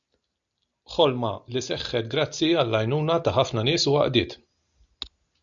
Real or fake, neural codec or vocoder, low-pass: real; none; 7.2 kHz